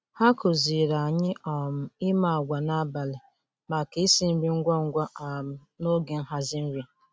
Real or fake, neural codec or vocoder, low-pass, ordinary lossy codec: real; none; none; none